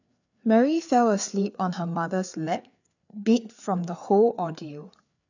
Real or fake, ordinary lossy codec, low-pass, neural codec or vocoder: fake; none; 7.2 kHz; codec, 16 kHz, 4 kbps, FreqCodec, larger model